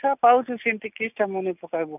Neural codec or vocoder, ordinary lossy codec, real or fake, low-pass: none; none; real; 3.6 kHz